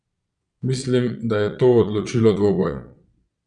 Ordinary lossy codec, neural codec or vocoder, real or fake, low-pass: none; vocoder, 22.05 kHz, 80 mel bands, Vocos; fake; 9.9 kHz